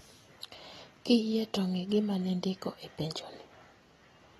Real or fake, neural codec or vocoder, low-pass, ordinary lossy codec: real; none; 19.8 kHz; AAC, 32 kbps